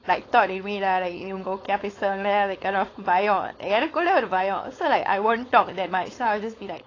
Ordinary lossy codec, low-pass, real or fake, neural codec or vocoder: AAC, 32 kbps; 7.2 kHz; fake; codec, 16 kHz, 4.8 kbps, FACodec